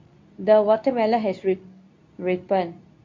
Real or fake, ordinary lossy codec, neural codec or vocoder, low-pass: fake; MP3, 48 kbps; codec, 24 kHz, 0.9 kbps, WavTokenizer, medium speech release version 2; 7.2 kHz